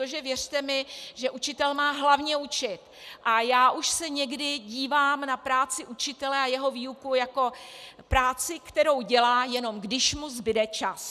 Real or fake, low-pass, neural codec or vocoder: real; 14.4 kHz; none